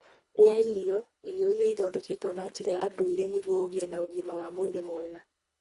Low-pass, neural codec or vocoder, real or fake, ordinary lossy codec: 10.8 kHz; codec, 24 kHz, 1.5 kbps, HILCodec; fake; Opus, 64 kbps